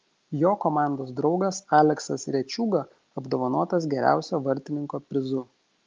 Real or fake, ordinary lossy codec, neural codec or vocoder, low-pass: real; Opus, 24 kbps; none; 7.2 kHz